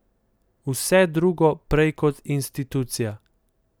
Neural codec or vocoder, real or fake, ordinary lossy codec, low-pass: none; real; none; none